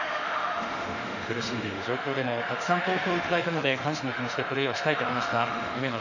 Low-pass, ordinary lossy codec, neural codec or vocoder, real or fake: 7.2 kHz; none; autoencoder, 48 kHz, 32 numbers a frame, DAC-VAE, trained on Japanese speech; fake